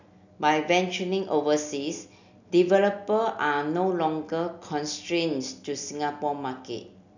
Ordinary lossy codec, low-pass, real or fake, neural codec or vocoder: none; 7.2 kHz; real; none